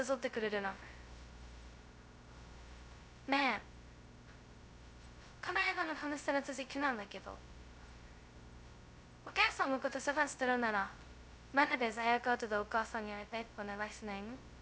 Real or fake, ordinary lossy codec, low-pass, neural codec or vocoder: fake; none; none; codec, 16 kHz, 0.2 kbps, FocalCodec